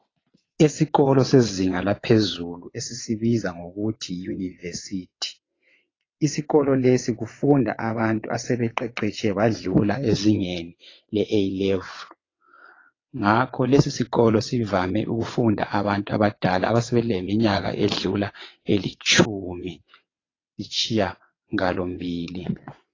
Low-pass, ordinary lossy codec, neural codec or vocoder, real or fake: 7.2 kHz; AAC, 32 kbps; vocoder, 22.05 kHz, 80 mel bands, WaveNeXt; fake